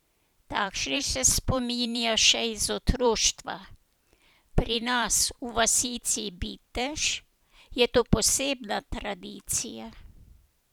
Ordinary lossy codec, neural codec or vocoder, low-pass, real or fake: none; vocoder, 44.1 kHz, 128 mel bands every 512 samples, BigVGAN v2; none; fake